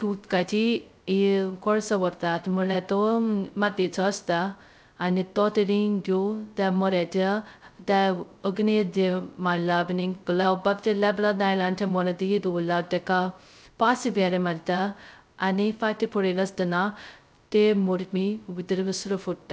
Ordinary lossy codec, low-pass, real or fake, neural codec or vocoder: none; none; fake; codec, 16 kHz, 0.2 kbps, FocalCodec